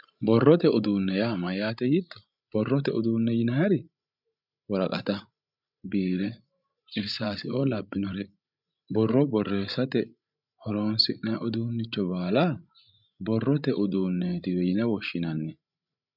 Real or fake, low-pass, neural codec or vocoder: fake; 5.4 kHz; codec, 16 kHz, 16 kbps, FreqCodec, larger model